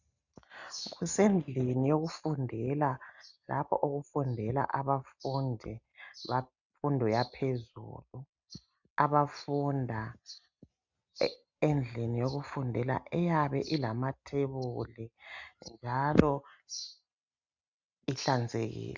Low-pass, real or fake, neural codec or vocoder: 7.2 kHz; real; none